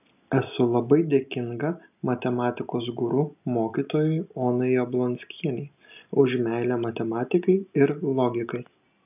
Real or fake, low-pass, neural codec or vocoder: real; 3.6 kHz; none